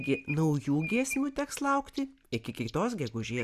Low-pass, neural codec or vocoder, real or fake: 14.4 kHz; none; real